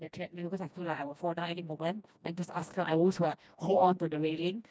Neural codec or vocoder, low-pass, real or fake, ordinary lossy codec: codec, 16 kHz, 1 kbps, FreqCodec, smaller model; none; fake; none